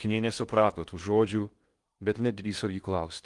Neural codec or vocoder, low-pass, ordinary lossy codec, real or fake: codec, 16 kHz in and 24 kHz out, 0.8 kbps, FocalCodec, streaming, 65536 codes; 10.8 kHz; Opus, 24 kbps; fake